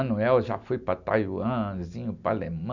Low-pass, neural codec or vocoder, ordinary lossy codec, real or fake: 7.2 kHz; none; none; real